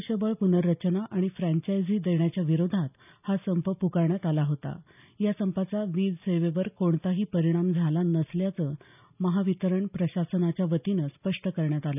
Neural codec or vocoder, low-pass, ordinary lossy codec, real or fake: none; 3.6 kHz; none; real